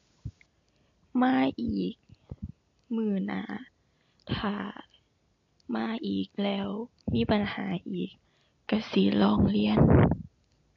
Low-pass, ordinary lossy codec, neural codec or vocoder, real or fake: 7.2 kHz; AAC, 48 kbps; none; real